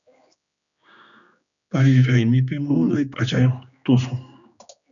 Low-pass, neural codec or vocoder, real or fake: 7.2 kHz; codec, 16 kHz, 2 kbps, X-Codec, HuBERT features, trained on general audio; fake